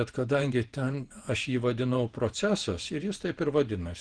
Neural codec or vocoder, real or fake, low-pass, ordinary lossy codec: vocoder, 48 kHz, 128 mel bands, Vocos; fake; 9.9 kHz; Opus, 16 kbps